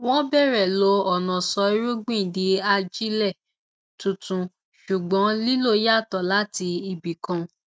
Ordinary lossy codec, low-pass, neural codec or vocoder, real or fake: none; none; none; real